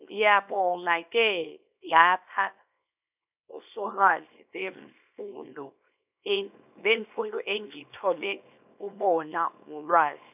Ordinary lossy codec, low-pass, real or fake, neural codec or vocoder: none; 3.6 kHz; fake; codec, 24 kHz, 0.9 kbps, WavTokenizer, small release